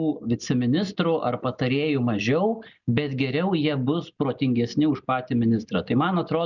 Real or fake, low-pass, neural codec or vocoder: real; 7.2 kHz; none